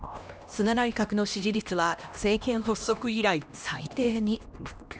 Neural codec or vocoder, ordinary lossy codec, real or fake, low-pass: codec, 16 kHz, 1 kbps, X-Codec, HuBERT features, trained on LibriSpeech; none; fake; none